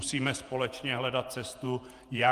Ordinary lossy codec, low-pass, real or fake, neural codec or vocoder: Opus, 32 kbps; 14.4 kHz; fake; vocoder, 48 kHz, 128 mel bands, Vocos